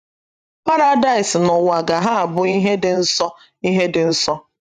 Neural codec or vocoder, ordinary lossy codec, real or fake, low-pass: vocoder, 44.1 kHz, 128 mel bands every 512 samples, BigVGAN v2; none; fake; 14.4 kHz